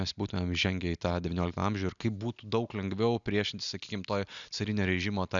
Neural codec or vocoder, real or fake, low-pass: none; real; 7.2 kHz